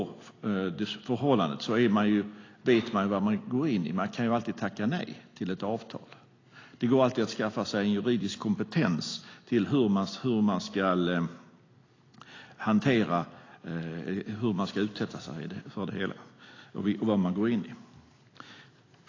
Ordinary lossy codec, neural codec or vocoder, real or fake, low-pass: AAC, 32 kbps; none; real; 7.2 kHz